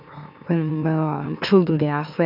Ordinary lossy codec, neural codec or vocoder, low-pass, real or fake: none; autoencoder, 44.1 kHz, a latent of 192 numbers a frame, MeloTTS; 5.4 kHz; fake